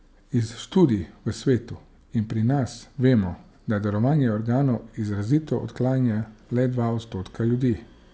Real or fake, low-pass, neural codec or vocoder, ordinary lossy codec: real; none; none; none